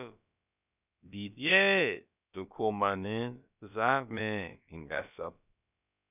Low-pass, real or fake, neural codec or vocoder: 3.6 kHz; fake; codec, 16 kHz, about 1 kbps, DyCAST, with the encoder's durations